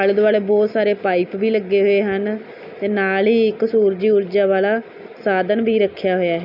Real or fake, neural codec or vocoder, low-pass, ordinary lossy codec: real; none; 5.4 kHz; none